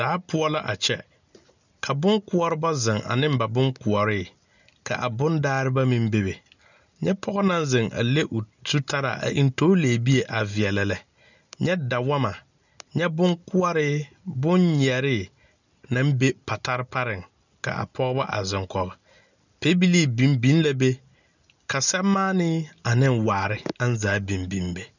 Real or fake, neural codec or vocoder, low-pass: real; none; 7.2 kHz